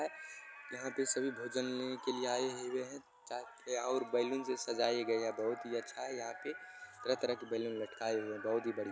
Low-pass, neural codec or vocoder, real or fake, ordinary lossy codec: none; none; real; none